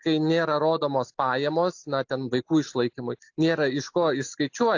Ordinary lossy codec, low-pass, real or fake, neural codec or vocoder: AAC, 48 kbps; 7.2 kHz; real; none